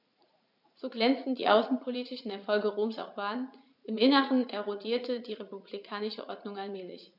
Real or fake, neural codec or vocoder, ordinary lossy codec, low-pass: fake; vocoder, 44.1 kHz, 80 mel bands, Vocos; none; 5.4 kHz